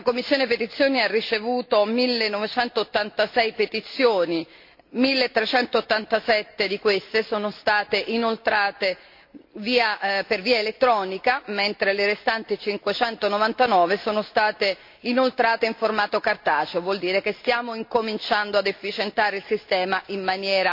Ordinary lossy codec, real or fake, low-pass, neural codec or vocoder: MP3, 32 kbps; real; 5.4 kHz; none